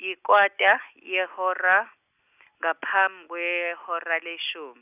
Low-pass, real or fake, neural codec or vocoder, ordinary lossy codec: 3.6 kHz; real; none; none